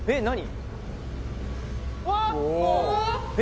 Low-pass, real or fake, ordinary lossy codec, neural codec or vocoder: none; real; none; none